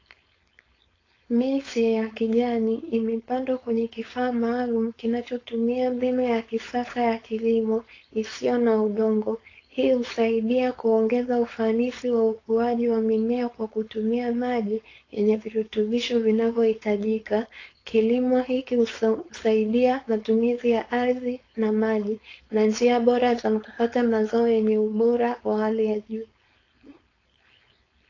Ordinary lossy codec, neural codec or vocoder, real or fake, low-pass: AAC, 32 kbps; codec, 16 kHz, 4.8 kbps, FACodec; fake; 7.2 kHz